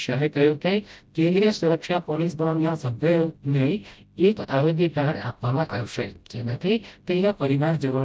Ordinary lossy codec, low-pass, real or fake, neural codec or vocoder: none; none; fake; codec, 16 kHz, 0.5 kbps, FreqCodec, smaller model